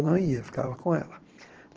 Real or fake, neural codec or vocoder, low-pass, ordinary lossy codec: fake; vocoder, 22.05 kHz, 80 mel bands, Vocos; 7.2 kHz; Opus, 16 kbps